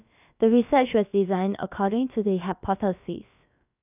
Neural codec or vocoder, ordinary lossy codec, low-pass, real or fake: codec, 16 kHz, about 1 kbps, DyCAST, with the encoder's durations; none; 3.6 kHz; fake